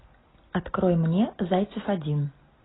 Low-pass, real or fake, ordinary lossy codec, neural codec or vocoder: 7.2 kHz; real; AAC, 16 kbps; none